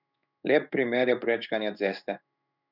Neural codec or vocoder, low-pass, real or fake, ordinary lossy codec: codec, 16 kHz in and 24 kHz out, 1 kbps, XY-Tokenizer; 5.4 kHz; fake; none